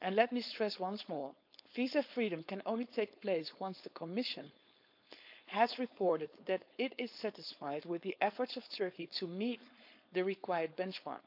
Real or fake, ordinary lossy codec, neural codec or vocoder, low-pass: fake; none; codec, 16 kHz, 4.8 kbps, FACodec; 5.4 kHz